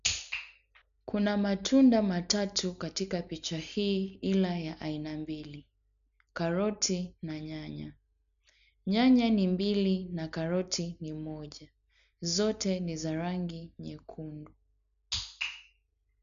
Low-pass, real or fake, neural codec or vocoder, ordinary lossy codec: 7.2 kHz; real; none; none